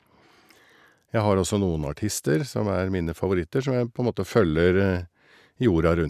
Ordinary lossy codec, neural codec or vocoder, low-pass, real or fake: none; none; 14.4 kHz; real